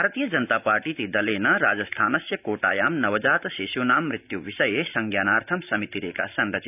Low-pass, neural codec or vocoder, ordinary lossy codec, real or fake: 3.6 kHz; none; none; real